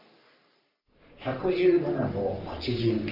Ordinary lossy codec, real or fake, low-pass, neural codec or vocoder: none; fake; 5.4 kHz; codec, 44.1 kHz, 3.4 kbps, Pupu-Codec